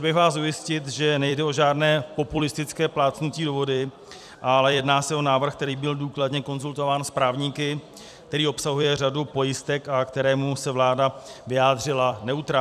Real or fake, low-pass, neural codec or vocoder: fake; 14.4 kHz; vocoder, 44.1 kHz, 128 mel bands every 256 samples, BigVGAN v2